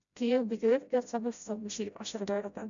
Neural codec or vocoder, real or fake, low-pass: codec, 16 kHz, 0.5 kbps, FreqCodec, smaller model; fake; 7.2 kHz